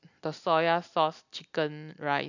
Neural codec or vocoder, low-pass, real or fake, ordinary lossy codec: none; 7.2 kHz; real; none